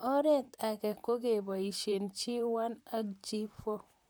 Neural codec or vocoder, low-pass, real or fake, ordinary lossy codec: vocoder, 44.1 kHz, 128 mel bands, Pupu-Vocoder; none; fake; none